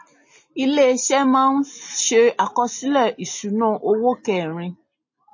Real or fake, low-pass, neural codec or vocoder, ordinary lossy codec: real; 7.2 kHz; none; MP3, 32 kbps